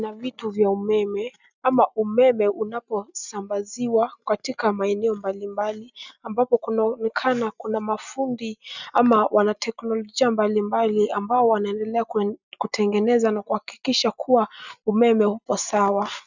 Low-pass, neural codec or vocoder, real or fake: 7.2 kHz; none; real